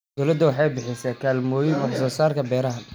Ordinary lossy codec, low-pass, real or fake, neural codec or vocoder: none; none; real; none